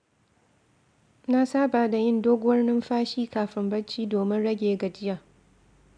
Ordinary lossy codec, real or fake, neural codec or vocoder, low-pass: AAC, 64 kbps; real; none; 9.9 kHz